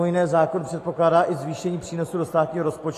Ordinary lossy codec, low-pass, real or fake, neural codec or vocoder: AAC, 48 kbps; 14.4 kHz; real; none